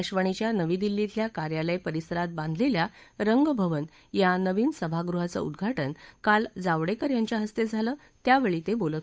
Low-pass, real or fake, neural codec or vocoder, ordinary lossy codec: none; fake; codec, 16 kHz, 8 kbps, FunCodec, trained on Chinese and English, 25 frames a second; none